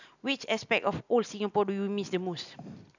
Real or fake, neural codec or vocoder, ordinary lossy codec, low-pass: real; none; none; 7.2 kHz